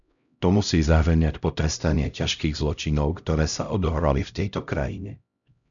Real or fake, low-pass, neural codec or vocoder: fake; 7.2 kHz; codec, 16 kHz, 0.5 kbps, X-Codec, HuBERT features, trained on LibriSpeech